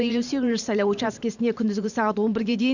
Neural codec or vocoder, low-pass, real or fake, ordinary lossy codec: vocoder, 44.1 kHz, 128 mel bands every 512 samples, BigVGAN v2; 7.2 kHz; fake; none